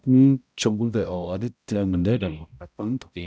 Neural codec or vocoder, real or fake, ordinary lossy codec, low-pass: codec, 16 kHz, 0.5 kbps, X-Codec, HuBERT features, trained on balanced general audio; fake; none; none